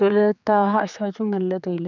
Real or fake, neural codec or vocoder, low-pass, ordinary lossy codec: fake; codec, 16 kHz, 4 kbps, X-Codec, HuBERT features, trained on general audio; 7.2 kHz; none